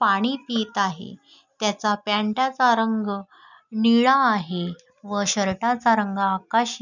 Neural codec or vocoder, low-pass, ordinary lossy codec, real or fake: none; 7.2 kHz; none; real